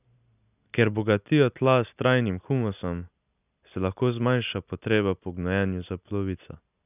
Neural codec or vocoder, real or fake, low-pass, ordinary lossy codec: none; real; 3.6 kHz; none